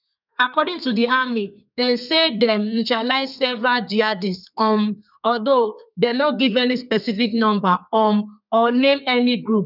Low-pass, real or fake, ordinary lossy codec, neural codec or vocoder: 5.4 kHz; fake; none; codec, 32 kHz, 1.9 kbps, SNAC